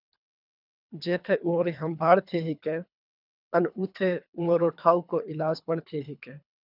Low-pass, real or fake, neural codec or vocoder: 5.4 kHz; fake; codec, 24 kHz, 3 kbps, HILCodec